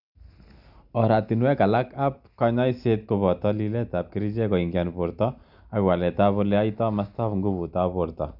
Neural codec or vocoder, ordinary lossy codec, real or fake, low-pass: none; none; real; 5.4 kHz